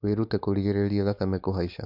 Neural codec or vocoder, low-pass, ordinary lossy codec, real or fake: codec, 16 kHz, 4.8 kbps, FACodec; 5.4 kHz; none; fake